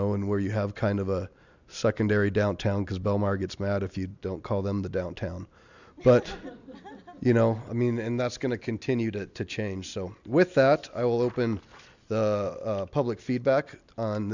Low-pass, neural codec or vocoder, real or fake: 7.2 kHz; none; real